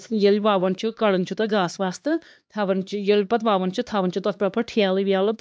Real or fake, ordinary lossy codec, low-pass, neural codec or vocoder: fake; none; none; codec, 16 kHz, 2 kbps, X-Codec, HuBERT features, trained on LibriSpeech